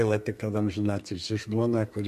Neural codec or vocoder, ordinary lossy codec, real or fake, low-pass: codec, 32 kHz, 1.9 kbps, SNAC; MP3, 64 kbps; fake; 14.4 kHz